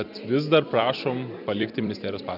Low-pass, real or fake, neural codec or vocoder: 5.4 kHz; fake; vocoder, 44.1 kHz, 128 mel bands every 256 samples, BigVGAN v2